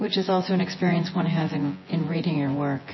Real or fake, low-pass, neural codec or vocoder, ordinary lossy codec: fake; 7.2 kHz; vocoder, 24 kHz, 100 mel bands, Vocos; MP3, 24 kbps